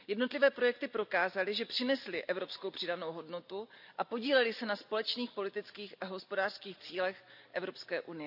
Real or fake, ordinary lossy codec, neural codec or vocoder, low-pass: real; none; none; 5.4 kHz